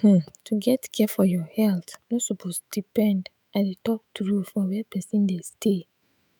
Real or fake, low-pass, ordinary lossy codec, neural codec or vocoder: fake; none; none; autoencoder, 48 kHz, 128 numbers a frame, DAC-VAE, trained on Japanese speech